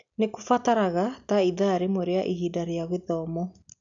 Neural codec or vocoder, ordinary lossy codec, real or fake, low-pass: none; none; real; 7.2 kHz